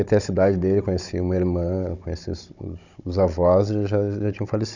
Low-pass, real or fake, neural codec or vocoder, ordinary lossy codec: 7.2 kHz; fake; codec, 16 kHz, 16 kbps, FunCodec, trained on Chinese and English, 50 frames a second; none